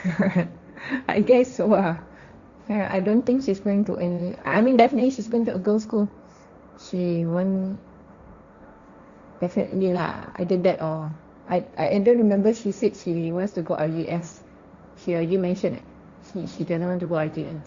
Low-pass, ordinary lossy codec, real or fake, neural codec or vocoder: 7.2 kHz; Opus, 64 kbps; fake; codec, 16 kHz, 1.1 kbps, Voila-Tokenizer